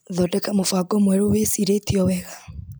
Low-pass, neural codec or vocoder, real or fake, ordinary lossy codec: none; none; real; none